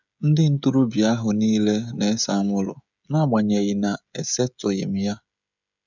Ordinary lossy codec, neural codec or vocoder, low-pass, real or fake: none; codec, 16 kHz, 16 kbps, FreqCodec, smaller model; 7.2 kHz; fake